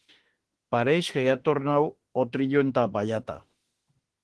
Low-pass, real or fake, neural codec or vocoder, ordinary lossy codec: 10.8 kHz; fake; autoencoder, 48 kHz, 32 numbers a frame, DAC-VAE, trained on Japanese speech; Opus, 16 kbps